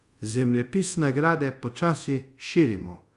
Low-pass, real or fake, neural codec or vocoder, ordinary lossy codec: 10.8 kHz; fake; codec, 24 kHz, 0.5 kbps, DualCodec; Opus, 64 kbps